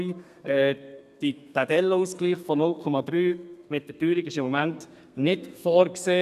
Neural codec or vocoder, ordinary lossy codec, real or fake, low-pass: codec, 32 kHz, 1.9 kbps, SNAC; none; fake; 14.4 kHz